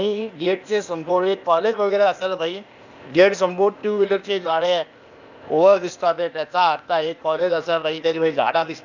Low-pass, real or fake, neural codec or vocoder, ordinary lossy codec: 7.2 kHz; fake; codec, 16 kHz, 0.8 kbps, ZipCodec; none